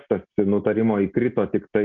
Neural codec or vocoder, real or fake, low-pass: none; real; 7.2 kHz